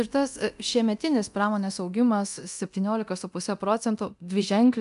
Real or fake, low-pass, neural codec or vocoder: fake; 10.8 kHz; codec, 24 kHz, 0.9 kbps, DualCodec